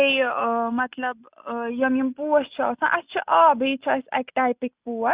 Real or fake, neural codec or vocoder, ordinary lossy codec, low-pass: real; none; Opus, 64 kbps; 3.6 kHz